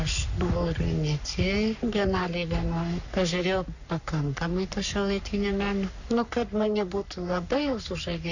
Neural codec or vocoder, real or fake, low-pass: codec, 44.1 kHz, 3.4 kbps, Pupu-Codec; fake; 7.2 kHz